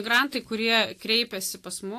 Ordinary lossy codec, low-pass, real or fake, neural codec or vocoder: AAC, 64 kbps; 14.4 kHz; real; none